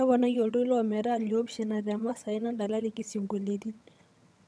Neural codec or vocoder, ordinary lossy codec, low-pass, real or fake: vocoder, 22.05 kHz, 80 mel bands, HiFi-GAN; none; none; fake